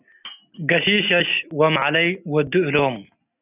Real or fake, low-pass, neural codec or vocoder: real; 3.6 kHz; none